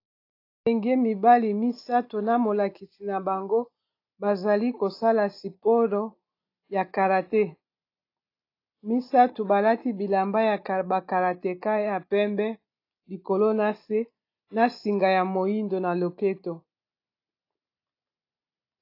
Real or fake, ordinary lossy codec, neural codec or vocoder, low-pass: real; AAC, 32 kbps; none; 5.4 kHz